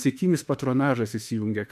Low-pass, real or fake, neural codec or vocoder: 14.4 kHz; fake; autoencoder, 48 kHz, 32 numbers a frame, DAC-VAE, trained on Japanese speech